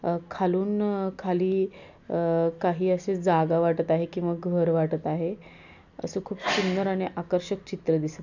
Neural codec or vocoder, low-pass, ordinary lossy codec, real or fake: none; 7.2 kHz; none; real